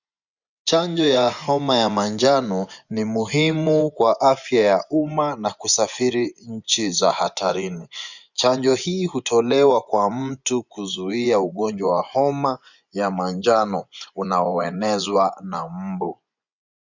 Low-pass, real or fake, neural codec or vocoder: 7.2 kHz; fake; vocoder, 24 kHz, 100 mel bands, Vocos